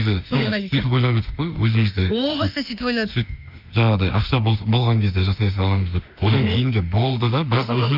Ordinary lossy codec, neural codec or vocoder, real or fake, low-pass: none; autoencoder, 48 kHz, 32 numbers a frame, DAC-VAE, trained on Japanese speech; fake; 5.4 kHz